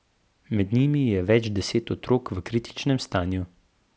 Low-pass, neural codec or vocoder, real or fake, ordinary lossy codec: none; none; real; none